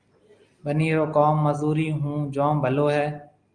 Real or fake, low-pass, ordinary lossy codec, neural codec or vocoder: real; 9.9 kHz; Opus, 32 kbps; none